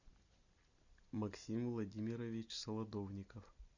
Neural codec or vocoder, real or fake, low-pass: none; real; 7.2 kHz